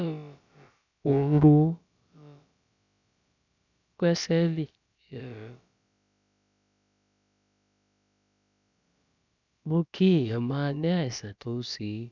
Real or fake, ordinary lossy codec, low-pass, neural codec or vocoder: fake; none; 7.2 kHz; codec, 16 kHz, about 1 kbps, DyCAST, with the encoder's durations